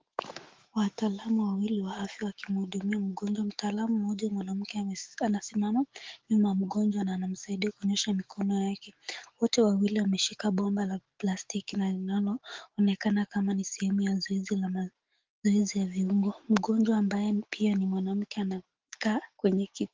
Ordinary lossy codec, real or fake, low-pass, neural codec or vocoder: Opus, 16 kbps; fake; 7.2 kHz; autoencoder, 48 kHz, 128 numbers a frame, DAC-VAE, trained on Japanese speech